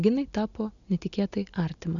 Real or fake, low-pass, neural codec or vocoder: real; 7.2 kHz; none